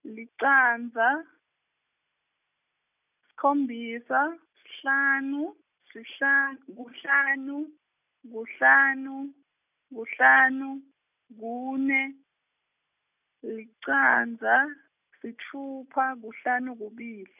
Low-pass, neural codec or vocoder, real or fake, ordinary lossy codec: 3.6 kHz; none; real; none